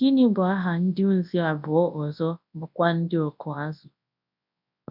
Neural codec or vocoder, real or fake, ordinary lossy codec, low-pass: codec, 24 kHz, 0.9 kbps, WavTokenizer, large speech release; fake; none; 5.4 kHz